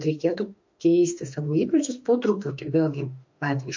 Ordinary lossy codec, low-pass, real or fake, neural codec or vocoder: MP3, 48 kbps; 7.2 kHz; fake; autoencoder, 48 kHz, 32 numbers a frame, DAC-VAE, trained on Japanese speech